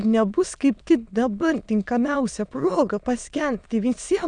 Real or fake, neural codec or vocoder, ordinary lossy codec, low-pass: fake; autoencoder, 22.05 kHz, a latent of 192 numbers a frame, VITS, trained on many speakers; Opus, 64 kbps; 9.9 kHz